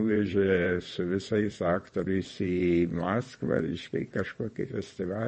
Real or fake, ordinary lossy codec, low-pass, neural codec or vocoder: fake; MP3, 32 kbps; 9.9 kHz; vocoder, 22.05 kHz, 80 mel bands, WaveNeXt